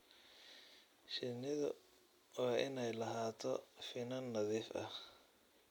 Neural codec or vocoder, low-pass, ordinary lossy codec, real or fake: none; 19.8 kHz; MP3, 96 kbps; real